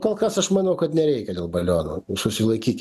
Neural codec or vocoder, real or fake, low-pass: none; real; 14.4 kHz